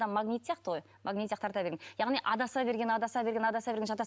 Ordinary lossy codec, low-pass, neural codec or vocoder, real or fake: none; none; none; real